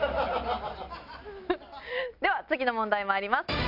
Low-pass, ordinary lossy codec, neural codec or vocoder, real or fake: 5.4 kHz; none; none; real